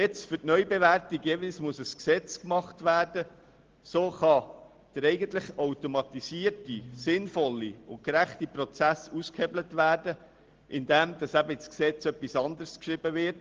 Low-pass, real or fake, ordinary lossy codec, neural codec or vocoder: 7.2 kHz; real; Opus, 16 kbps; none